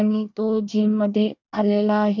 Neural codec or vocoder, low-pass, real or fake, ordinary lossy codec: codec, 24 kHz, 1 kbps, SNAC; 7.2 kHz; fake; none